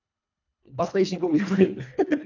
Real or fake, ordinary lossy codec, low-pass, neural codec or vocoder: fake; none; 7.2 kHz; codec, 24 kHz, 1.5 kbps, HILCodec